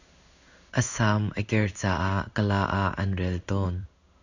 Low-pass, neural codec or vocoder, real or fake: 7.2 kHz; codec, 16 kHz in and 24 kHz out, 1 kbps, XY-Tokenizer; fake